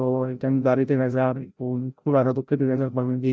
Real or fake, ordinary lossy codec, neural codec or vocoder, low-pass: fake; none; codec, 16 kHz, 0.5 kbps, FreqCodec, larger model; none